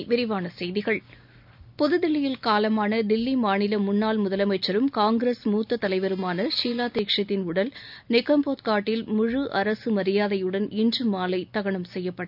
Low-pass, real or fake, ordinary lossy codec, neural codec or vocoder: 5.4 kHz; real; none; none